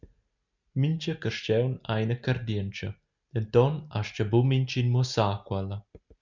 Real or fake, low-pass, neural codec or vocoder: real; 7.2 kHz; none